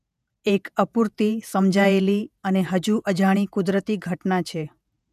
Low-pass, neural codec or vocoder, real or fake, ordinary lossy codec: 14.4 kHz; vocoder, 48 kHz, 128 mel bands, Vocos; fake; none